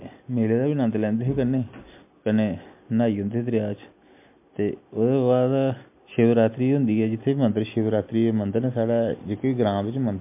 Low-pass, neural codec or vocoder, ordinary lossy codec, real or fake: 3.6 kHz; none; MP3, 32 kbps; real